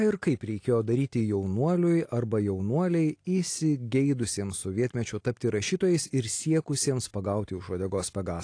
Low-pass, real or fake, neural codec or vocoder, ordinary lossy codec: 9.9 kHz; real; none; AAC, 48 kbps